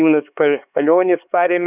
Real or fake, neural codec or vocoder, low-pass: fake; codec, 16 kHz, 4 kbps, X-Codec, HuBERT features, trained on LibriSpeech; 3.6 kHz